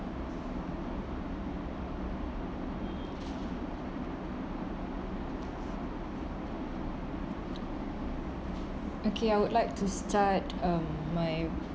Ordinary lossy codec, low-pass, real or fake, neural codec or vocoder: none; none; real; none